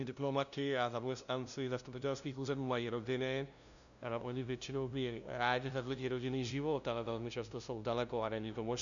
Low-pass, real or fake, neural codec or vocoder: 7.2 kHz; fake; codec, 16 kHz, 0.5 kbps, FunCodec, trained on LibriTTS, 25 frames a second